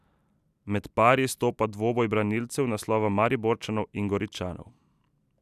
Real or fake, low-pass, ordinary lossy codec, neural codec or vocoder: real; 14.4 kHz; none; none